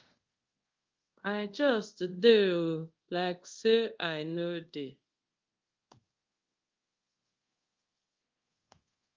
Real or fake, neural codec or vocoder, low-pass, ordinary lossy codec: fake; codec, 24 kHz, 0.5 kbps, DualCodec; 7.2 kHz; Opus, 24 kbps